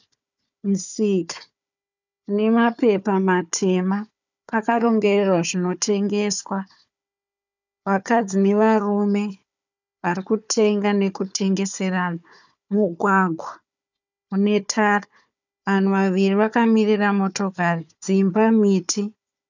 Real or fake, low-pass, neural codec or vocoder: fake; 7.2 kHz; codec, 16 kHz, 4 kbps, FunCodec, trained on Chinese and English, 50 frames a second